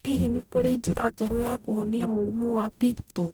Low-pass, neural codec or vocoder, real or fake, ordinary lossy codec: none; codec, 44.1 kHz, 0.9 kbps, DAC; fake; none